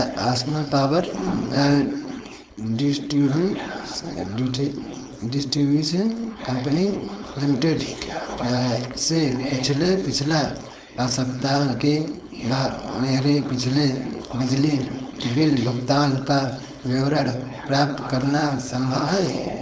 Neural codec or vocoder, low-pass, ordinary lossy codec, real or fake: codec, 16 kHz, 4.8 kbps, FACodec; none; none; fake